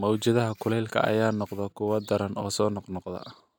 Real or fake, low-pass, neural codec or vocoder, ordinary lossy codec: real; none; none; none